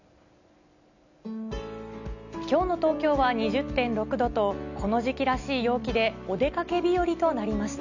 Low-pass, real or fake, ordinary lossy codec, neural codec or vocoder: 7.2 kHz; real; none; none